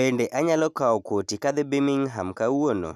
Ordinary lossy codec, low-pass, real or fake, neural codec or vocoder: none; 14.4 kHz; real; none